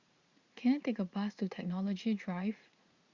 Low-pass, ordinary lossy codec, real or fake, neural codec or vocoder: 7.2 kHz; Opus, 64 kbps; fake; vocoder, 44.1 kHz, 80 mel bands, Vocos